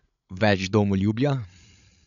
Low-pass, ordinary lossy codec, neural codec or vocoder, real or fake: 7.2 kHz; none; codec, 16 kHz, 8 kbps, FreqCodec, larger model; fake